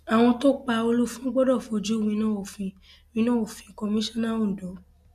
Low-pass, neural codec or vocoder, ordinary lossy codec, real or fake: 14.4 kHz; none; none; real